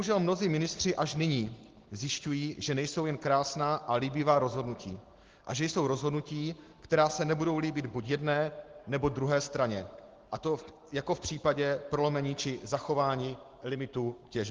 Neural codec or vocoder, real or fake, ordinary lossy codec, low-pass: none; real; Opus, 16 kbps; 7.2 kHz